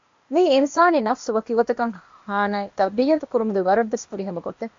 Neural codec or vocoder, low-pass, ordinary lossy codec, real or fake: codec, 16 kHz, 0.8 kbps, ZipCodec; 7.2 kHz; MP3, 48 kbps; fake